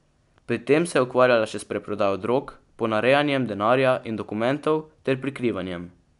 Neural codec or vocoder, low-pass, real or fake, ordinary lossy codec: none; 10.8 kHz; real; none